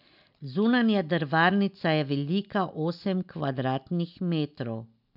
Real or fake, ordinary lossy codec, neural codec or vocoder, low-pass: real; none; none; 5.4 kHz